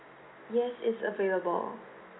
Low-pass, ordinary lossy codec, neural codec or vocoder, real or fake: 7.2 kHz; AAC, 16 kbps; none; real